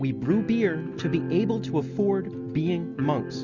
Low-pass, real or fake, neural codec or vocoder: 7.2 kHz; real; none